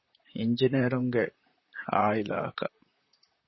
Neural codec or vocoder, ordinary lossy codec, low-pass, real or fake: vocoder, 44.1 kHz, 128 mel bands, Pupu-Vocoder; MP3, 24 kbps; 7.2 kHz; fake